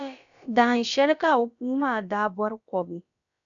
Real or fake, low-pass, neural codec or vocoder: fake; 7.2 kHz; codec, 16 kHz, about 1 kbps, DyCAST, with the encoder's durations